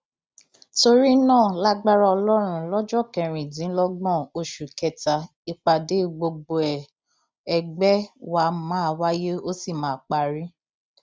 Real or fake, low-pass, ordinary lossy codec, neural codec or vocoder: real; none; none; none